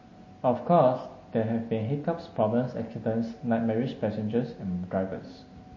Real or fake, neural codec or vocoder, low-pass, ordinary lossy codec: real; none; 7.2 kHz; MP3, 32 kbps